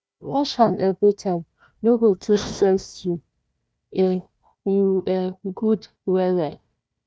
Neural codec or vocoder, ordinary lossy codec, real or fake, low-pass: codec, 16 kHz, 1 kbps, FunCodec, trained on Chinese and English, 50 frames a second; none; fake; none